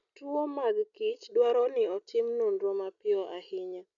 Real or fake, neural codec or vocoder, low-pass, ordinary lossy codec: real; none; 7.2 kHz; none